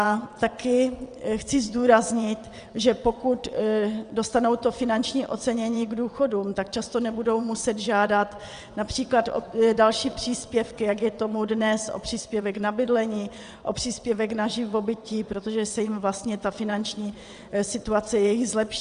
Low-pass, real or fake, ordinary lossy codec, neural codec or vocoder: 9.9 kHz; fake; Opus, 64 kbps; vocoder, 22.05 kHz, 80 mel bands, WaveNeXt